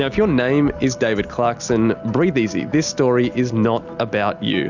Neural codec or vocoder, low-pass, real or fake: none; 7.2 kHz; real